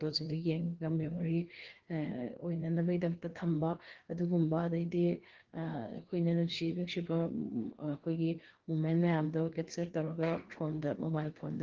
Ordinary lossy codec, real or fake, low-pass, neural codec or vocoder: Opus, 16 kbps; fake; 7.2 kHz; codec, 16 kHz, 2 kbps, FreqCodec, larger model